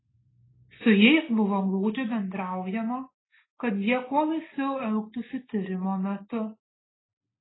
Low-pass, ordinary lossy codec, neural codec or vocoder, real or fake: 7.2 kHz; AAC, 16 kbps; none; real